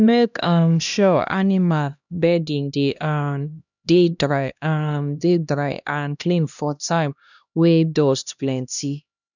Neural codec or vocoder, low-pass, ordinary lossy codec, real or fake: codec, 16 kHz, 1 kbps, X-Codec, HuBERT features, trained on LibriSpeech; 7.2 kHz; none; fake